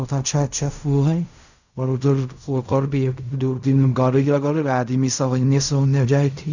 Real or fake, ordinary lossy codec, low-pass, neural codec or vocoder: fake; none; 7.2 kHz; codec, 16 kHz in and 24 kHz out, 0.4 kbps, LongCat-Audio-Codec, fine tuned four codebook decoder